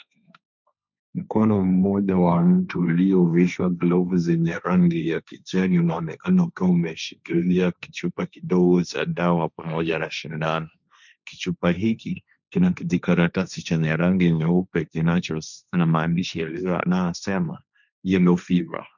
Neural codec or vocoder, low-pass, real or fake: codec, 16 kHz, 1.1 kbps, Voila-Tokenizer; 7.2 kHz; fake